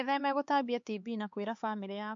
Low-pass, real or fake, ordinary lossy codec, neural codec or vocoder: 7.2 kHz; fake; MP3, 48 kbps; codec, 16 kHz, 4 kbps, X-Codec, HuBERT features, trained on LibriSpeech